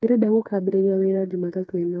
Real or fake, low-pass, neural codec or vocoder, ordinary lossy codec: fake; none; codec, 16 kHz, 4 kbps, FreqCodec, smaller model; none